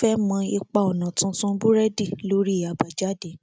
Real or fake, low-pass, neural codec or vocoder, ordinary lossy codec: real; none; none; none